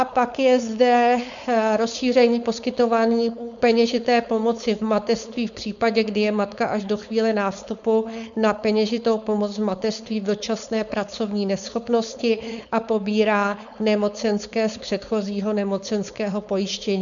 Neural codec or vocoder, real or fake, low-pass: codec, 16 kHz, 4.8 kbps, FACodec; fake; 7.2 kHz